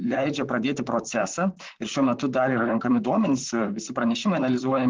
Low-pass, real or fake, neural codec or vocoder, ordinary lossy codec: 7.2 kHz; fake; vocoder, 44.1 kHz, 128 mel bands, Pupu-Vocoder; Opus, 16 kbps